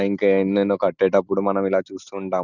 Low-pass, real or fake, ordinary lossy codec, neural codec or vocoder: 7.2 kHz; real; none; none